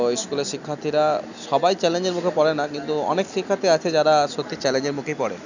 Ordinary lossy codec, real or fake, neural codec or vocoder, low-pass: none; real; none; 7.2 kHz